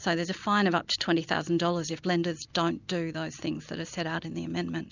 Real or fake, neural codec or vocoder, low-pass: real; none; 7.2 kHz